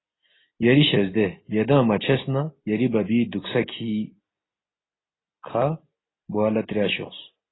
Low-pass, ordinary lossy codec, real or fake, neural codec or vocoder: 7.2 kHz; AAC, 16 kbps; real; none